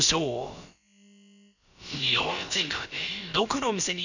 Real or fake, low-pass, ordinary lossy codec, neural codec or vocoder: fake; 7.2 kHz; none; codec, 16 kHz, about 1 kbps, DyCAST, with the encoder's durations